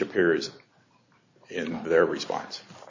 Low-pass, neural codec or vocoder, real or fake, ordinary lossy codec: 7.2 kHz; none; real; AAC, 48 kbps